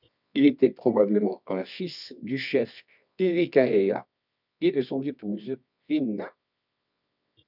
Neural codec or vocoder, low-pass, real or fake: codec, 24 kHz, 0.9 kbps, WavTokenizer, medium music audio release; 5.4 kHz; fake